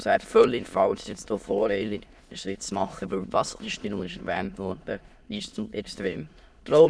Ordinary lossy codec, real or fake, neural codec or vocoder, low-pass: none; fake; autoencoder, 22.05 kHz, a latent of 192 numbers a frame, VITS, trained on many speakers; none